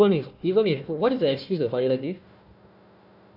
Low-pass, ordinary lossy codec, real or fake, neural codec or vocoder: 5.4 kHz; none; fake; codec, 16 kHz, 1 kbps, FunCodec, trained on Chinese and English, 50 frames a second